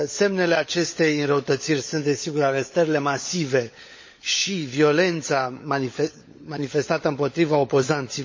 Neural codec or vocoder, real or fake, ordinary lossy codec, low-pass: codec, 16 kHz, 16 kbps, FunCodec, trained on LibriTTS, 50 frames a second; fake; MP3, 32 kbps; 7.2 kHz